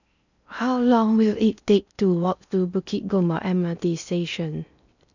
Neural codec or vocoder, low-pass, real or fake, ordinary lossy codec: codec, 16 kHz in and 24 kHz out, 0.6 kbps, FocalCodec, streaming, 4096 codes; 7.2 kHz; fake; none